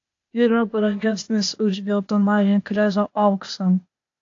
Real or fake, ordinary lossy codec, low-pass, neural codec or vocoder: fake; AAC, 48 kbps; 7.2 kHz; codec, 16 kHz, 0.8 kbps, ZipCodec